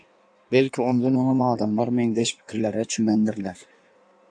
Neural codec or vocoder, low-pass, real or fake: codec, 16 kHz in and 24 kHz out, 1.1 kbps, FireRedTTS-2 codec; 9.9 kHz; fake